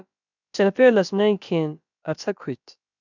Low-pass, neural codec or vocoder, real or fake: 7.2 kHz; codec, 16 kHz, about 1 kbps, DyCAST, with the encoder's durations; fake